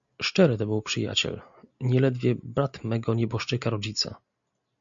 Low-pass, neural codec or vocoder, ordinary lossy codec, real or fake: 7.2 kHz; none; MP3, 64 kbps; real